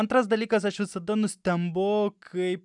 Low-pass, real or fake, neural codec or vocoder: 10.8 kHz; real; none